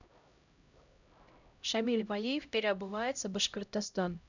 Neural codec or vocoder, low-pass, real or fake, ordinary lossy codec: codec, 16 kHz, 0.5 kbps, X-Codec, HuBERT features, trained on LibriSpeech; 7.2 kHz; fake; none